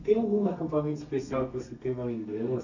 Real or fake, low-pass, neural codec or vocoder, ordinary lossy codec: fake; 7.2 kHz; codec, 32 kHz, 1.9 kbps, SNAC; none